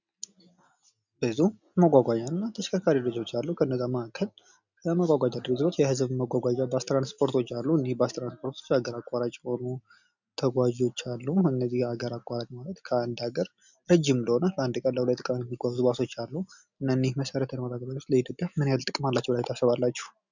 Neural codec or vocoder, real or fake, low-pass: none; real; 7.2 kHz